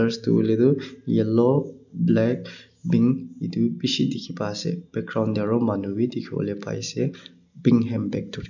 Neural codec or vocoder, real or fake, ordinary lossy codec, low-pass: none; real; none; 7.2 kHz